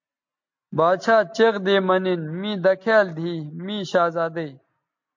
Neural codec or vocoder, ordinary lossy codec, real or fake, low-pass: none; MP3, 48 kbps; real; 7.2 kHz